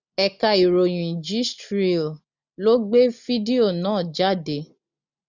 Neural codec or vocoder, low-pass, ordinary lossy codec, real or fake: none; 7.2 kHz; none; real